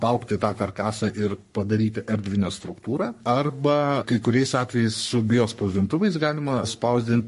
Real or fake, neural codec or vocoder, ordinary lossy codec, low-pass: fake; codec, 44.1 kHz, 3.4 kbps, Pupu-Codec; MP3, 48 kbps; 14.4 kHz